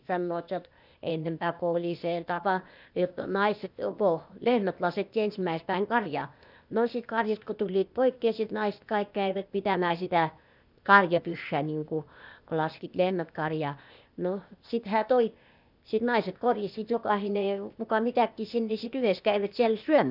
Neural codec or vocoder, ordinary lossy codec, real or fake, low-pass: codec, 16 kHz, 0.8 kbps, ZipCodec; none; fake; 5.4 kHz